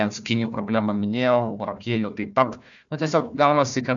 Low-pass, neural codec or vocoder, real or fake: 7.2 kHz; codec, 16 kHz, 1 kbps, FunCodec, trained on Chinese and English, 50 frames a second; fake